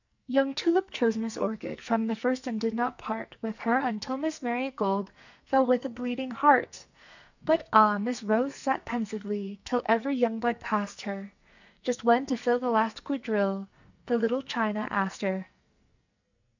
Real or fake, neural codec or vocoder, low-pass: fake; codec, 44.1 kHz, 2.6 kbps, SNAC; 7.2 kHz